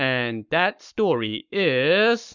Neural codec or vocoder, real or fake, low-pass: codec, 44.1 kHz, 7.8 kbps, Pupu-Codec; fake; 7.2 kHz